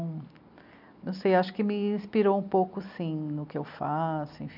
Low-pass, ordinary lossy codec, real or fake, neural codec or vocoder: 5.4 kHz; none; real; none